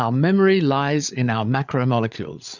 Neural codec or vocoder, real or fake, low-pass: codec, 16 kHz, 8 kbps, FreqCodec, larger model; fake; 7.2 kHz